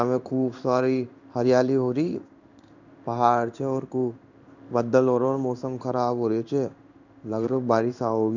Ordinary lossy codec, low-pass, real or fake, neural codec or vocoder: none; 7.2 kHz; fake; codec, 16 kHz in and 24 kHz out, 1 kbps, XY-Tokenizer